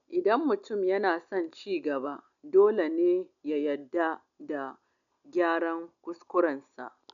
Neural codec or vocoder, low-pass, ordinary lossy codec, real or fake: none; 7.2 kHz; none; real